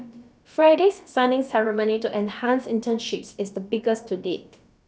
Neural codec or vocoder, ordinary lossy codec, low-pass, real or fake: codec, 16 kHz, about 1 kbps, DyCAST, with the encoder's durations; none; none; fake